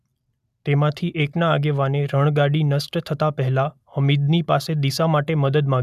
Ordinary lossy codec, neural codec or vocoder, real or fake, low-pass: none; none; real; 14.4 kHz